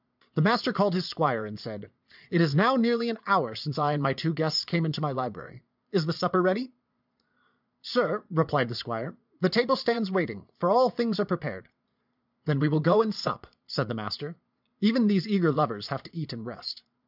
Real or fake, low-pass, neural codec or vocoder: fake; 5.4 kHz; vocoder, 22.05 kHz, 80 mel bands, Vocos